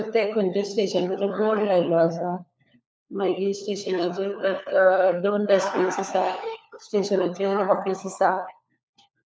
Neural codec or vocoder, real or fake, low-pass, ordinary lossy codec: codec, 16 kHz, 4 kbps, FunCodec, trained on LibriTTS, 50 frames a second; fake; none; none